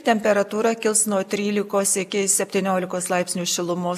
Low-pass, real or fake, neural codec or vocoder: 14.4 kHz; real; none